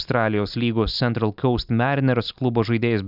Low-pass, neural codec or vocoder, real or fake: 5.4 kHz; none; real